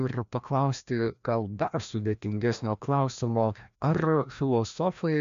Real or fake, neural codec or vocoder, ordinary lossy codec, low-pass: fake; codec, 16 kHz, 1 kbps, FreqCodec, larger model; MP3, 64 kbps; 7.2 kHz